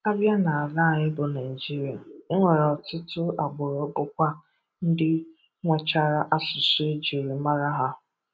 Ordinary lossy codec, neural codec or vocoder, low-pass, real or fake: none; none; none; real